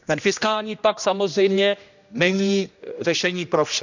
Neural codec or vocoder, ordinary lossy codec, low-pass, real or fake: codec, 16 kHz, 1 kbps, X-Codec, HuBERT features, trained on general audio; none; 7.2 kHz; fake